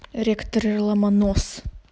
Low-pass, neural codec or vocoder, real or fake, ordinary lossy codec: none; none; real; none